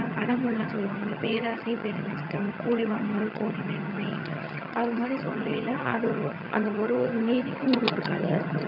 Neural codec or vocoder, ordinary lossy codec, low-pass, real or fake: vocoder, 22.05 kHz, 80 mel bands, HiFi-GAN; none; 5.4 kHz; fake